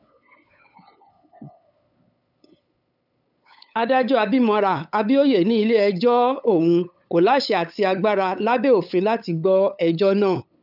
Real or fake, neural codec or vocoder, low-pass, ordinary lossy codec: fake; codec, 16 kHz, 8 kbps, FunCodec, trained on LibriTTS, 25 frames a second; 5.4 kHz; none